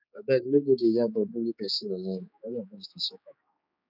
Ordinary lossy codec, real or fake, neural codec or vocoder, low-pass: none; fake; codec, 16 kHz, 4 kbps, X-Codec, HuBERT features, trained on general audio; 5.4 kHz